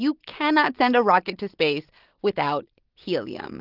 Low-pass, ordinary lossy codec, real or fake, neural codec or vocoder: 5.4 kHz; Opus, 16 kbps; real; none